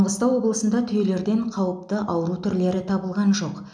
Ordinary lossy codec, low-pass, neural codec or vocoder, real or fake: none; 9.9 kHz; none; real